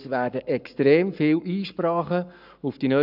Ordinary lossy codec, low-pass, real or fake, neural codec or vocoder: none; 5.4 kHz; fake; codec, 44.1 kHz, 7.8 kbps, Pupu-Codec